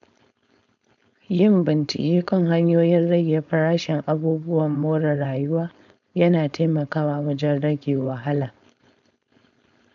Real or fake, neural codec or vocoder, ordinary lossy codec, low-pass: fake; codec, 16 kHz, 4.8 kbps, FACodec; none; 7.2 kHz